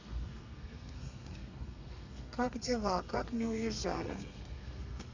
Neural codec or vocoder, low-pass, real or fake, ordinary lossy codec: codec, 32 kHz, 1.9 kbps, SNAC; 7.2 kHz; fake; AAC, 48 kbps